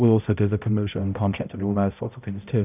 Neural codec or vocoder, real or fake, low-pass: codec, 16 kHz, 0.5 kbps, X-Codec, HuBERT features, trained on balanced general audio; fake; 3.6 kHz